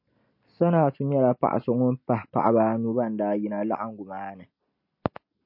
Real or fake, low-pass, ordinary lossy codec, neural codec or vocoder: real; 5.4 kHz; MP3, 32 kbps; none